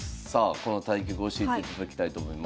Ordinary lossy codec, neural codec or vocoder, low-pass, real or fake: none; none; none; real